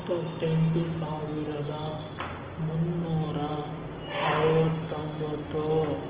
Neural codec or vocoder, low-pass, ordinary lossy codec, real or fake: none; 3.6 kHz; Opus, 16 kbps; real